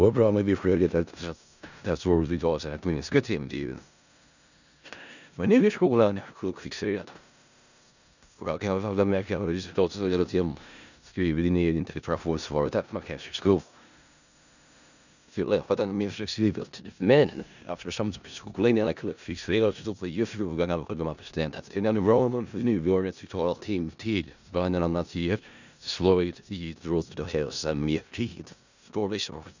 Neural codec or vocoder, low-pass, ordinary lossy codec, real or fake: codec, 16 kHz in and 24 kHz out, 0.4 kbps, LongCat-Audio-Codec, four codebook decoder; 7.2 kHz; none; fake